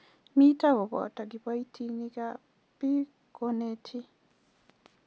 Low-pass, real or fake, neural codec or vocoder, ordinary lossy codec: none; real; none; none